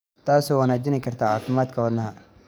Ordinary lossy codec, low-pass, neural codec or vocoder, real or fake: none; none; codec, 44.1 kHz, 7.8 kbps, DAC; fake